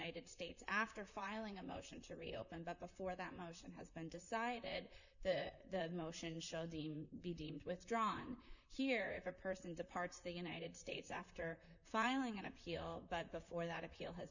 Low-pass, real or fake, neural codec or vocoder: 7.2 kHz; fake; vocoder, 44.1 kHz, 128 mel bands, Pupu-Vocoder